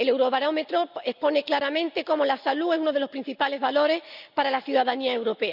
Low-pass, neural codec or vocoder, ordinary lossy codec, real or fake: 5.4 kHz; none; none; real